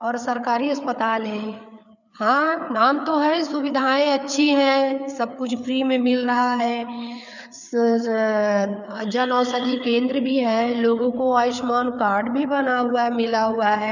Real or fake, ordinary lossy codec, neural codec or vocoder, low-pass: fake; none; codec, 16 kHz, 4 kbps, FreqCodec, larger model; 7.2 kHz